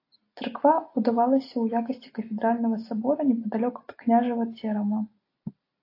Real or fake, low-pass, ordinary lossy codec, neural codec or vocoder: real; 5.4 kHz; AAC, 32 kbps; none